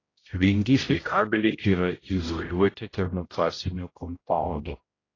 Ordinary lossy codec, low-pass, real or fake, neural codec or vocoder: AAC, 32 kbps; 7.2 kHz; fake; codec, 16 kHz, 0.5 kbps, X-Codec, HuBERT features, trained on general audio